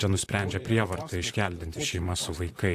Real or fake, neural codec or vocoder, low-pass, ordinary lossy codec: fake; vocoder, 44.1 kHz, 128 mel bands, Pupu-Vocoder; 14.4 kHz; AAC, 64 kbps